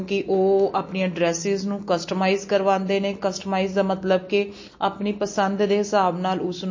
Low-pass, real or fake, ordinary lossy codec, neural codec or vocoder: 7.2 kHz; real; MP3, 32 kbps; none